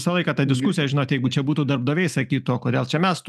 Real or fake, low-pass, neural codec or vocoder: real; 14.4 kHz; none